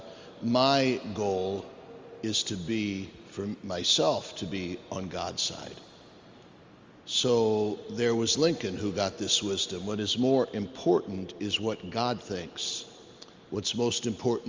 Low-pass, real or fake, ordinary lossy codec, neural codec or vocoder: 7.2 kHz; real; Opus, 32 kbps; none